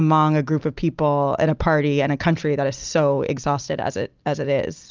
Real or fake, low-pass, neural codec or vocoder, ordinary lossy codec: real; 7.2 kHz; none; Opus, 24 kbps